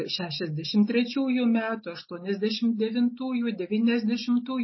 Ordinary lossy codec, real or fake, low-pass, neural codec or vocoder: MP3, 24 kbps; real; 7.2 kHz; none